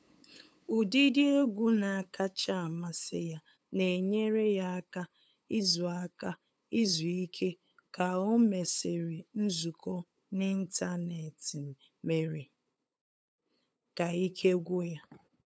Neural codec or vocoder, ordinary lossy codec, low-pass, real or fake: codec, 16 kHz, 8 kbps, FunCodec, trained on LibriTTS, 25 frames a second; none; none; fake